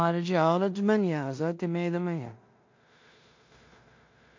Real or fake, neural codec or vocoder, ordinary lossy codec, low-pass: fake; codec, 16 kHz in and 24 kHz out, 0.4 kbps, LongCat-Audio-Codec, two codebook decoder; MP3, 48 kbps; 7.2 kHz